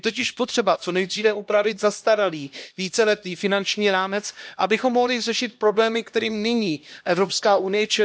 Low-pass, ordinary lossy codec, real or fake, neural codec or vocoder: none; none; fake; codec, 16 kHz, 1 kbps, X-Codec, HuBERT features, trained on LibriSpeech